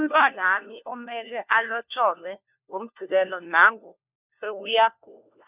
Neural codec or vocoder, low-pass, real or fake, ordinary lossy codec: codec, 16 kHz, 1 kbps, FunCodec, trained on LibriTTS, 50 frames a second; 3.6 kHz; fake; none